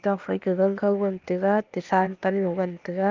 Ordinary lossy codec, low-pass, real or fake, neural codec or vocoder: Opus, 32 kbps; 7.2 kHz; fake; codec, 16 kHz, 0.8 kbps, ZipCodec